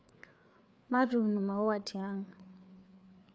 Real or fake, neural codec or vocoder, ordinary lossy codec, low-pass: fake; codec, 16 kHz, 4 kbps, FreqCodec, larger model; none; none